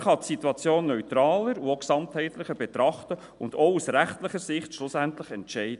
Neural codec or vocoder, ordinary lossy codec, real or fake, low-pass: none; none; real; 10.8 kHz